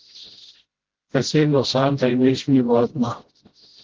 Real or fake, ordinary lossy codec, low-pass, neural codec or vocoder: fake; Opus, 16 kbps; 7.2 kHz; codec, 16 kHz, 0.5 kbps, FreqCodec, smaller model